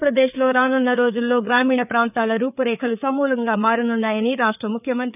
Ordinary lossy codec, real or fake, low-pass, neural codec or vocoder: none; fake; 3.6 kHz; codec, 16 kHz, 4 kbps, FreqCodec, larger model